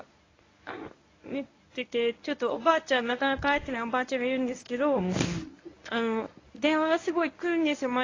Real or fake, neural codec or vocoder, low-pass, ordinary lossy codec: fake; codec, 24 kHz, 0.9 kbps, WavTokenizer, medium speech release version 1; 7.2 kHz; AAC, 32 kbps